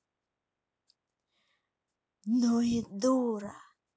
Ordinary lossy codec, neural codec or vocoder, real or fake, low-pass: none; none; real; none